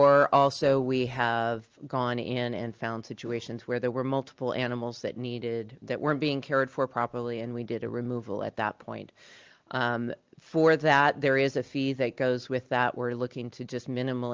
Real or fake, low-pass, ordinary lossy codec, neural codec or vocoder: real; 7.2 kHz; Opus, 24 kbps; none